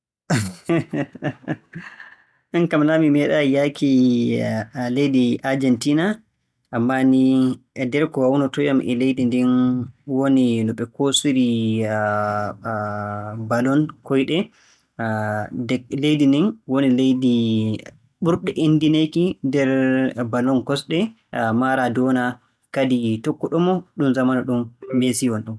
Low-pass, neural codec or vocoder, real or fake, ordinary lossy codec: none; none; real; none